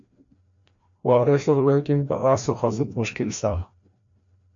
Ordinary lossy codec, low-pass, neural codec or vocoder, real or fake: MP3, 48 kbps; 7.2 kHz; codec, 16 kHz, 1 kbps, FreqCodec, larger model; fake